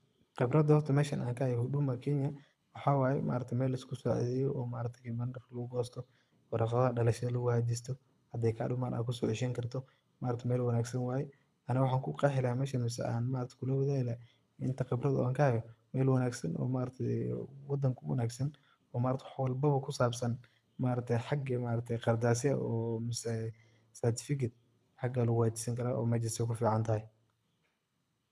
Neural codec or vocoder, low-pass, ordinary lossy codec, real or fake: codec, 24 kHz, 6 kbps, HILCodec; none; none; fake